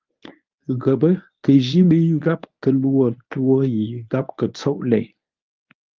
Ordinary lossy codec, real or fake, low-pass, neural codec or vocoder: Opus, 24 kbps; fake; 7.2 kHz; codec, 24 kHz, 0.9 kbps, WavTokenizer, medium speech release version 1